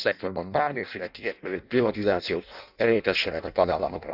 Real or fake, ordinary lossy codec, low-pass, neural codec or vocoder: fake; none; 5.4 kHz; codec, 16 kHz in and 24 kHz out, 0.6 kbps, FireRedTTS-2 codec